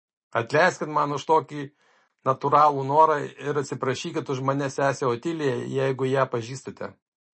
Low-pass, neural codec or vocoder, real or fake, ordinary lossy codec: 9.9 kHz; none; real; MP3, 32 kbps